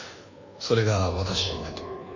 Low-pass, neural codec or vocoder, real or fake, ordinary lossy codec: 7.2 kHz; codec, 24 kHz, 1.2 kbps, DualCodec; fake; none